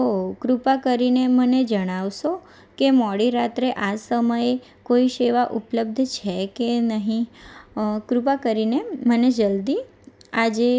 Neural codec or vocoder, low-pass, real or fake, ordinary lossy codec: none; none; real; none